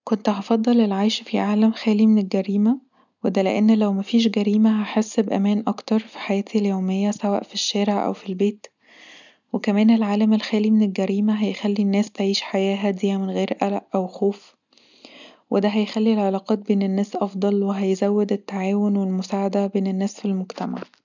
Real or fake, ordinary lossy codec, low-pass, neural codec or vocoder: real; none; 7.2 kHz; none